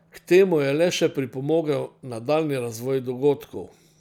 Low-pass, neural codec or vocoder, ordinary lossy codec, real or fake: 19.8 kHz; vocoder, 44.1 kHz, 128 mel bands every 256 samples, BigVGAN v2; none; fake